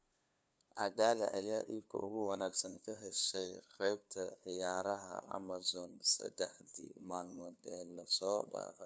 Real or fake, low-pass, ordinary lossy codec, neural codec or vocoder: fake; none; none; codec, 16 kHz, 2 kbps, FunCodec, trained on LibriTTS, 25 frames a second